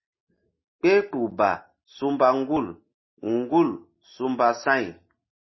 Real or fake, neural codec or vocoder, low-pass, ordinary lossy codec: real; none; 7.2 kHz; MP3, 24 kbps